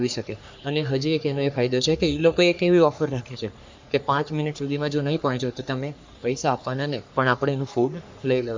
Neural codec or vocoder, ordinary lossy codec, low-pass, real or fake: codec, 44.1 kHz, 3.4 kbps, Pupu-Codec; MP3, 64 kbps; 7.2 kHz; fake